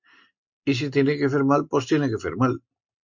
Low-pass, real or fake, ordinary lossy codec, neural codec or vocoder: 7.2 kHz; real; MP3, 48 kbps; none